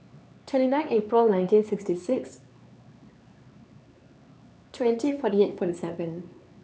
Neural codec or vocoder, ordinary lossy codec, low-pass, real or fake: codec, 16 kHz, 4 kbps, X-Codec, HuBERT features, trained on LibriSpeech; none; none; fake